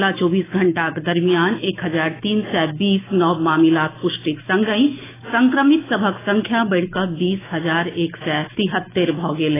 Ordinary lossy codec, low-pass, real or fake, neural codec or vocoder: AAC, 16 kbps; 3.6 kHz; fake; autoencoder, 48 kHz, 128 numbers a frame, DAC-VAE, trained on Japanese speech